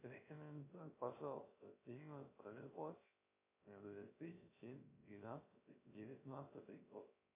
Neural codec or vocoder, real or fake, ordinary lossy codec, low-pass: codec, 16 kHz, 0.3 kbps, FocalCodec; fake; MP3, 24 kbps; 3.6 kHz